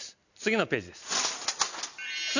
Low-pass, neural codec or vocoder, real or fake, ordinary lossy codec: 7.2 kHz; none; real; none